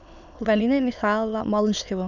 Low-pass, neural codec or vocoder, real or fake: 7.2 kHz; autoencoder, 22.05 kHz, a latent of 192 numbers a frame, VITS, trained on many speakers; fake